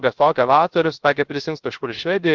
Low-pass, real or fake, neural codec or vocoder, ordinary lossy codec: 7.2 kHz; fake; codec, 16 kHz, 0.3 kbps, FocalCodec; Opus, 16 kbps